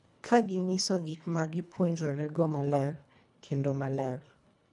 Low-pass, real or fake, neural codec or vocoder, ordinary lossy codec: 10.8 kHz; fake; codec, 24 kHz, 1.5 kbps, HILCodec; none